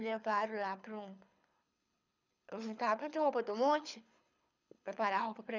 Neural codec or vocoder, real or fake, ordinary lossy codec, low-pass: codec, 24 kHz, 6 kbps, HILCodec; fake; none; 7.2 kHz